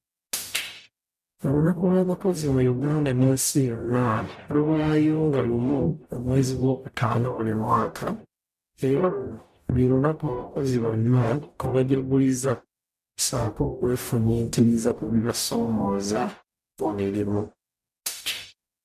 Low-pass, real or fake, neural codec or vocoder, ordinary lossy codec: 14.4 kHz; fake; codec, 44.1 kHz, 0.9 kbps, DAC; none